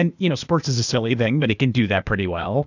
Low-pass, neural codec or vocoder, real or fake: 7.2 kHz; codec, 16 kHz, 0.8 kbps, ZipCodec; fake